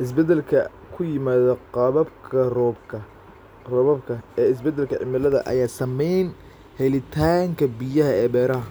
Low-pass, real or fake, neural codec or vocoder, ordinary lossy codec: none; real; none; none